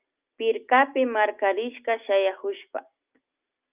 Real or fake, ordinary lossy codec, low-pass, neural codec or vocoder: real; Opus, 24 kbps; 3.6 kHz; none